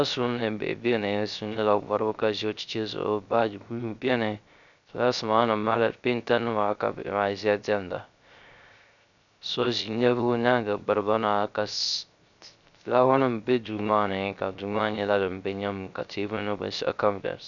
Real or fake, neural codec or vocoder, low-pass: fake; codec, 16 kHz, 0.3 kbps, FocalCodec; 7.2 kHz